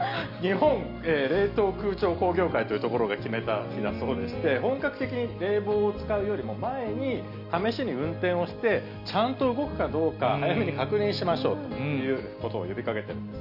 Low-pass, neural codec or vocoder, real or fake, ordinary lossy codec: 5.4 kHz; none; real; none